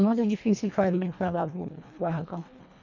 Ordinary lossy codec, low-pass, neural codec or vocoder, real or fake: none; 7.2 kHz; codec, 24 kHz, 1.5 kbps, HILCodec; fake